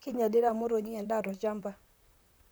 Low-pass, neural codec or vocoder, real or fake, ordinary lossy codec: none; vocoder, 44.1 kHz, 128 mel bands, Pupu-Vocoder; fake; none